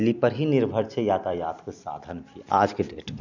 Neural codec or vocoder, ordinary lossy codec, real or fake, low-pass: none; none; real; 7.2 kHz